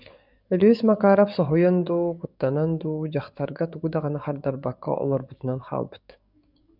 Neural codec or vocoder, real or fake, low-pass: codec, 44.1 kHz, 7.8 kbps, DAC; fake; 5.4 kHz